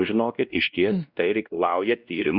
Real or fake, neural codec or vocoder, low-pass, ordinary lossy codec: fake; codec, 16 kHz, 1 kbps, X-Codec, WavLM features, trained on Multilingual LibriSpeech; 5.4 kHz; Opus, 64 kbps